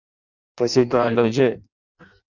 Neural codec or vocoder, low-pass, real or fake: codec, 16 kHz in and 24 kHz out, 0.6 kbps, FireRedTTS-2 codec; 7.2 kHz; fake